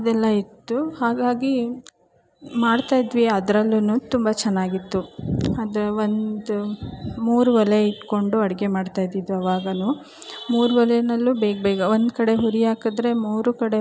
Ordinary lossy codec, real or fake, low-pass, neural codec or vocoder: none; real; none; none